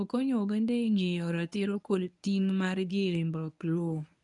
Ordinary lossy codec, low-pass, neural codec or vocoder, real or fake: none; 10.8 kHz; codec, 24 kHz, 0.9 kbps, WavTokenizer, medium speech release version 1; fake